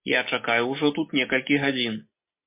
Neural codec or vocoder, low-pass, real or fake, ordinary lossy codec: none; 3.6 kHz; real; MP3, 24 kbps